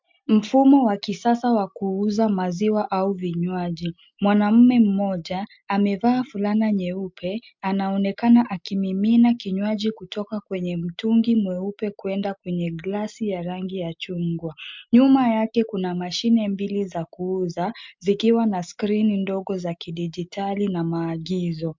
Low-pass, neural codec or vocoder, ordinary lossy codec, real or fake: 7.2 kHz; none; MP3, 64 kbps; real